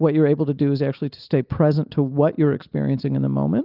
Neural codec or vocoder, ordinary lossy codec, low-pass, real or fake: none; Opus, 32 kbps; 5.4 kHz; real